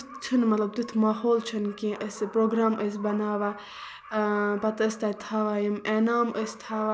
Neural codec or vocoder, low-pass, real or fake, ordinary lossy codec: none; none; real; none